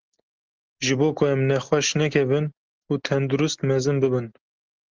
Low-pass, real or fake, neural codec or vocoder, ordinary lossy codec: 7.2 kHz; real; none; Opus, 16 kbps